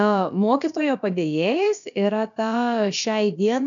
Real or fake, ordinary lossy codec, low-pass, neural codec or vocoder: fake; MP3, 96 kbps; 7.2 kHz; codec, 16 kHz, about 1 kbps, DyCAST, with the encoder's durations